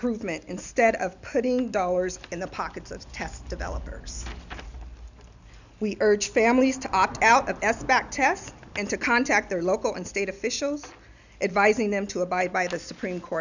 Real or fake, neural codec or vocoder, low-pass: real; none; 7.2 kHz